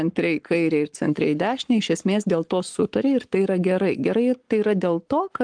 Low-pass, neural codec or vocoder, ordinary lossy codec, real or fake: 9.9 kHz; codec, 44.1 kHz, 7.8 kbps, Pupu-Codec; Opus, 24 kbps; fake